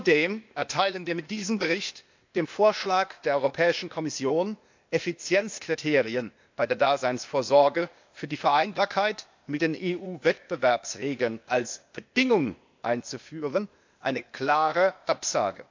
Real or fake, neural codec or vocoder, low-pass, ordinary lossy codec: fake; codec, 16 kHz, 0.8 kbps, ZipCodec; 7.2 kHz; MP3, 64 kbps